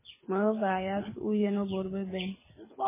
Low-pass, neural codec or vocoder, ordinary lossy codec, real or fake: 3.6 kHz; codec, 24 kHz, 6 kbps, HILCodec; MP3, 16 kbps; fake